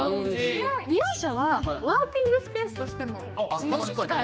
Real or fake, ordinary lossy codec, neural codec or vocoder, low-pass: fake; none; codec, 16 kHz, 2 kbps, X-Codec, HuBERT features, trained on general audio; none